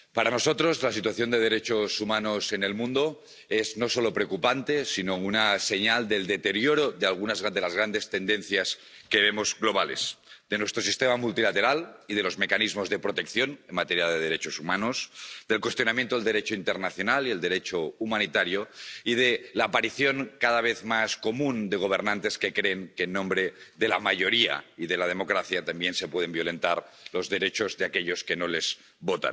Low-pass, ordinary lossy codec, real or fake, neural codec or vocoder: none; none; real; none